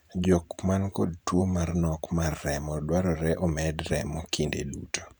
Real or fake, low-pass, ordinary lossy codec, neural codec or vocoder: fake; none; none; vocoder, 44.1 kHz, 128 mel bands every 512 samples, BigVGAN v2